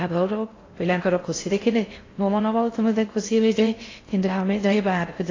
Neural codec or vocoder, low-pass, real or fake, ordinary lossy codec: codec, 16 kHz in and 24 kHz out, 0.6 kbps, FocalCodec, streaming, 4096 codes; 7.2 kHz; fake; AAC, 32 kbps